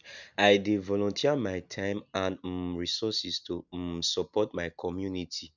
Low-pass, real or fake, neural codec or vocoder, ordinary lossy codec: 7.2 kHz; real; none; none